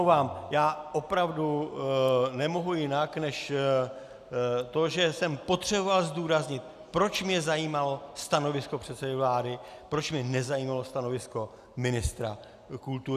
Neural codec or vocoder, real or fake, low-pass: none; real; 14.4 kHz